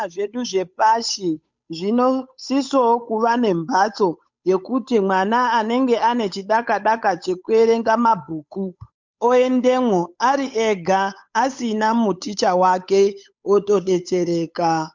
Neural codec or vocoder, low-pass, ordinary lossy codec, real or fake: codec, 16 kHz, 8 kbps, FunCodec, trained on Chinese and English, 25 frames a second; 7.2 kHz; MP3, 64 kbps; fake